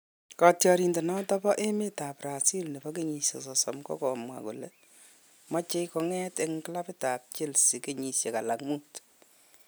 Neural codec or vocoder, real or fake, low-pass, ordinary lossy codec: none; real; none; none